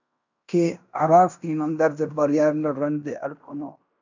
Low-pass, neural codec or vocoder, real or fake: 7.2 kHz; codec, 16 kHz in and 24 kHz out, 0.9 kbps, LongCat-Audio-Codec, fine tuned four codebook decoder; fake